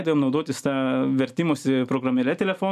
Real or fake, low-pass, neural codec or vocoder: real; 14.4 kHz; none